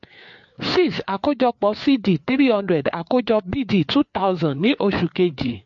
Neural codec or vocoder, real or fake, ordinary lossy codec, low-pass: codec, 16 kHz, 4 kbps, FreqCodec, larger model; fake; MP3, 48 kbps; 7.2 kHz